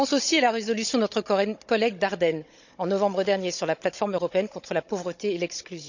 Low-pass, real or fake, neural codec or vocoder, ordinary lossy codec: 7.2 kHz; fake; codec, 16 kHz, 16 kbps, FunCodec, trained on Chinese and English, 50 frames a second; none